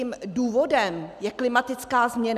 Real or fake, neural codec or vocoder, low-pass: real; none; 14.4 kHz